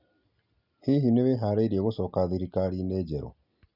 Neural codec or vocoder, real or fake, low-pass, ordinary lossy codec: none; real; 5.4 kHz; none